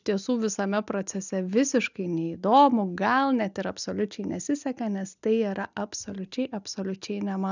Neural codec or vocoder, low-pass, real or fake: none; 7.2 kHz; real